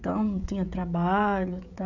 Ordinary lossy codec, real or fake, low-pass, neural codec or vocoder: none; fake; 7.2 kHz; codec, 16 kHz, 16 kbps, FreqCodec, smaller model